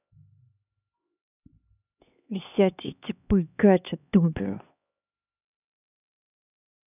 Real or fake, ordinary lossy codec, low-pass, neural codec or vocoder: fake; none; 3.6 kHz; codec, 16 kHz, 2 kbps, X-Codec, HuBERT features, trained on LibriSpeech